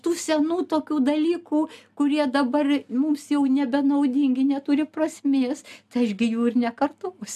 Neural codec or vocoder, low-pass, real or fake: none; 14.4 kHz; real